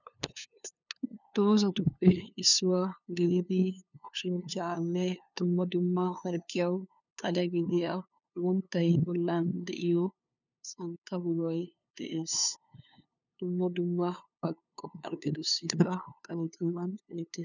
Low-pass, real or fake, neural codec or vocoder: 7.2 kHz; fake; codec, 16 kHz, 2 kbps, FunCodec, trained on LibriTTS, 25 frames a second